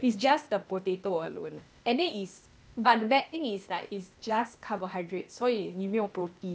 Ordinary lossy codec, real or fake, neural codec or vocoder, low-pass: none; fake; codec, 16 kHz, 0.8 kbps, ZipCodec; none